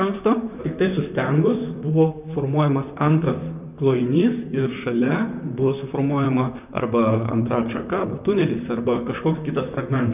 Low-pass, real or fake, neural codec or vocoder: 3.6 kHz; fake; vocoder, 44.1 kHz, 128 mel bands, Pupu-Vocoder